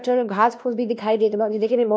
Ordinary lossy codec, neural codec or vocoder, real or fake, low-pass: none; codec, 16 kHz, 1 kbps, X-Codec, WavLM features, trained on Multilingual LibriSpeech; fake; none